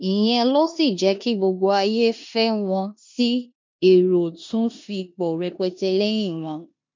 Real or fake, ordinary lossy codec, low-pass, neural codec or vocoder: fake; MP3, 48 kbps; 7.2 kHz; codec, 16 kHz in and 24 kHz out, 0.9 kbps, LongCat-Audio-Codec, four codebook decoder